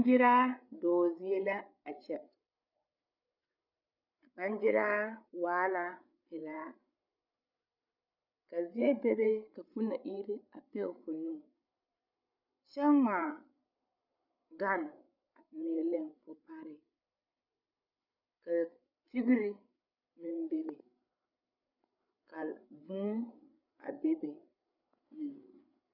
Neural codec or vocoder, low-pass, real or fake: codec, 16 kHz, 8 kbps, FreqCodec, larger model; 5.4 kHz; fake